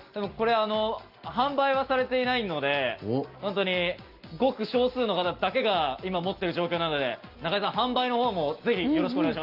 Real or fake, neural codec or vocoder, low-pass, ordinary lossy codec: real; none; 5.4 kHz; Opus, 32 kbps